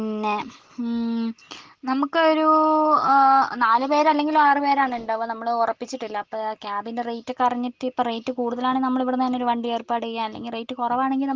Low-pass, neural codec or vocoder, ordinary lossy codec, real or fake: 7.2 kHz; none; Opus, 16 kbps; real